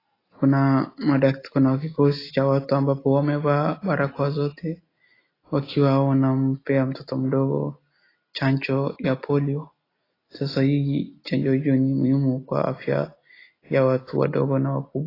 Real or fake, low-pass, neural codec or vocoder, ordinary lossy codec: real; 5.4 kHz; none; AAC, 24 kbps